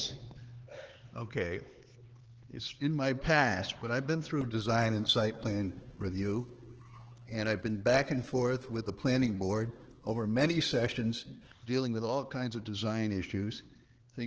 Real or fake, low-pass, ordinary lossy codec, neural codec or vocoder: fake; 7.2 kHz; Opus, 16 kbps; codec, 16 kHz, 4 kbps, X-Codec, HuBERT features, trained on LibriSpeech